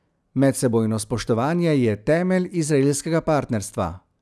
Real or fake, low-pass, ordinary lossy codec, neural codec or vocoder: real; none; none; none